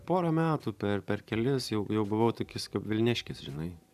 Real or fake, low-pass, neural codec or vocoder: fake; 14.4 kHz; vocoder, 44.1 kHz, 128 mel bands every 512 samples, BigVGAN v2